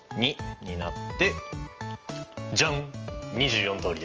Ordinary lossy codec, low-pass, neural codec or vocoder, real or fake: Opus, 24 kbps; 7.2 kHz; none; real